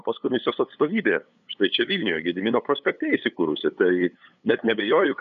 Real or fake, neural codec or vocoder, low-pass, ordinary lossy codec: fake; codec, 16 kHz, 8 kbps, FunCodec, trained on LibriTTS, 25 frames a second; 7.2 kHz; AAC, 96 kbps